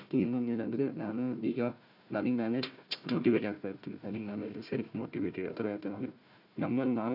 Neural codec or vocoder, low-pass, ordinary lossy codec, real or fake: codec, 16 kHz, 1 kbps, FunCodec, trained on Chinese and English, 50 frames a second; 5.4 kHz; none; fake